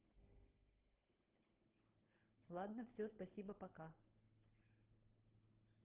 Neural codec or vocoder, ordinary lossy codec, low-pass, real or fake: codec, 16 kHz, 16 kbps, FunCodec, trained on Chinese and English, 50 frames a second; Opus, 16 kbps; 3.6 kHz; fake